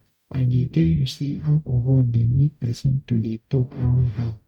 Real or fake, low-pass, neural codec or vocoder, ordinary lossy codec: fake; 19.8 kHz; codec, 44.1 kHz, 0.9 kbps, DAC; none